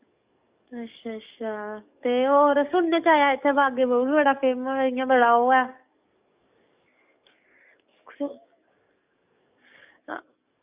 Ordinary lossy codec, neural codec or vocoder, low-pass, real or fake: Opus, 64 kbps; codec, 44.1 kHz, 7.8 kbps, DAC; 3.6 kHz; fake